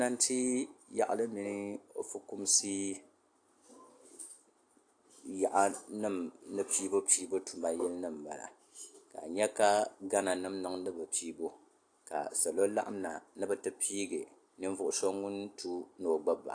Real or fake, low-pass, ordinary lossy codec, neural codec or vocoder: real; 9.9 kHz; AAC, 48 kbps; none